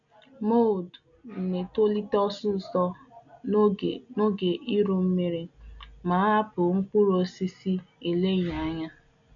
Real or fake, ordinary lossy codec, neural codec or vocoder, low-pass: real; none; none; 7.2 kHz